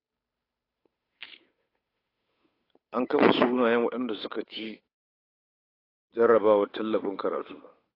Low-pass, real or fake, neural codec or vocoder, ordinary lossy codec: 5.4 kHz; fake; codec, 16 kHz, 8 kbps, FunCodec, trained on Chinese and English, 25 frames a second; AAC, 24 kbps